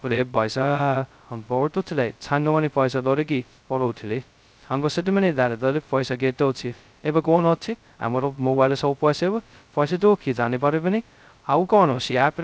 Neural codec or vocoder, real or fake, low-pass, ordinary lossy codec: codec, 16 kHz, 0.2 kbps, FocalCodec; fake; none; none